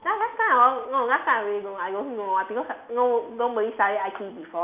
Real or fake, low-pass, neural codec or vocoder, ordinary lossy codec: real; 3.6 kHz; none; none